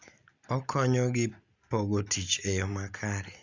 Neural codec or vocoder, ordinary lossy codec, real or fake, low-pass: none; Opus, 64 kbps; real; 7.2 kHz